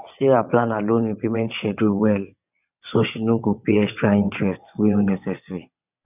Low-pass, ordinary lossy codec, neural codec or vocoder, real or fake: 3.6 kHz; none; vocoder, 22.05 kHz, 80 mel bands, WaveNeXt; fake